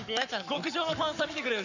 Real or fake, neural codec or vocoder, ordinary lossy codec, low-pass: fake; codec, 44.1 kHz, 7.8 kbps, Pupu-Codec; none; 7.2 kHz